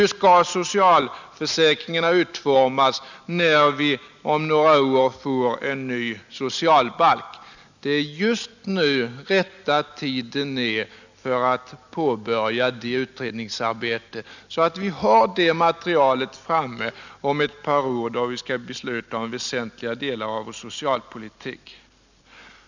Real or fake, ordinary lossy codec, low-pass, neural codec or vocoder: real; none; 7.2 kHz; none